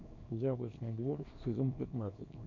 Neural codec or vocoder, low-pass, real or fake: codec, 24 kHz, 0.9 kbps, WavTokenizer, small release; 7.2 kHz; fake